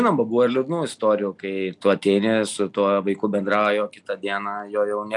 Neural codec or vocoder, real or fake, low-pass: none; real; 10.8 kHz